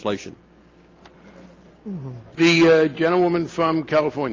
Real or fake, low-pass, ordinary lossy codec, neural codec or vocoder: real; 7.2 kHz; Opus, 32 kbps; none